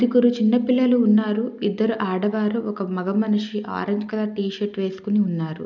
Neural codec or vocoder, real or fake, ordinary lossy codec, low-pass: none; real; none; 7.2 kHz